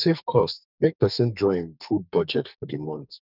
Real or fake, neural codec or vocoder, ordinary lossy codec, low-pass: fake; codec, 44.1 kHz, 2.6 kbps, SNAC; none; 5.4 kHz